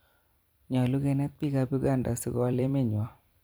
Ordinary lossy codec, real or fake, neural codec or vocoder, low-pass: none; fake; vocoder, 44.1 kHz, 128 mel bands every 256 samples, BigVGAN v2; none